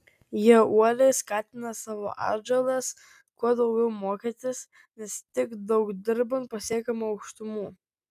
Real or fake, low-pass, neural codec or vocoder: real; 14.4 kHz; none